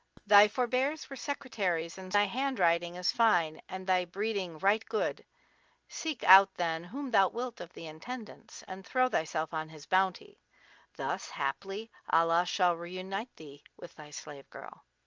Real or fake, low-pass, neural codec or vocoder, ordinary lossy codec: real; 7.2 kHz; none; Opus, 24 kbps